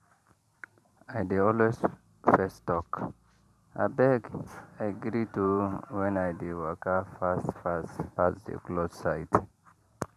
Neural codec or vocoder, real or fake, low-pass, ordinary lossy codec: vocoder, 44.1 kHz, 128 mel bands every 512 samples, BigVGAN v2; fake; 14.4 kHz; none